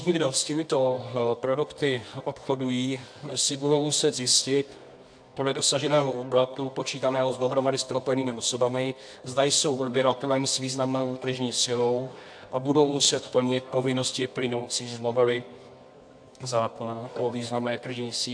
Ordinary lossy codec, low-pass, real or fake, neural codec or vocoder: AAC, 64 kbps; 9.9 kHz; fake; codec, 24 kHz, 0.9 kbps, WavTokenizer, medium music audio release